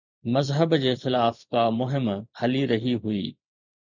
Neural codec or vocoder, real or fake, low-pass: vocoder, 44.1 kHz, 128 mel bands every 512 samples, BigVGAN v2; fake; 7.2 kHz